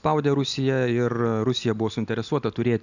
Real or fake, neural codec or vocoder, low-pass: real; none; 7.2 kHz